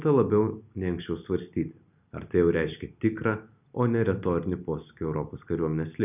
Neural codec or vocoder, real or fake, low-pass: none; real; 3.6 kHz